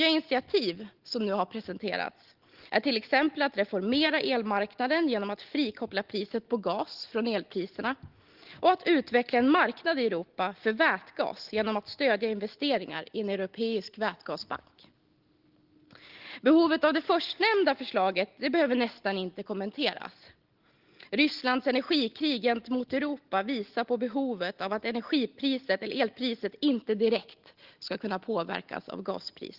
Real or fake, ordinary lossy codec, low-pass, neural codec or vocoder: real; Opus, 16 kbps; 5.4 kHz; none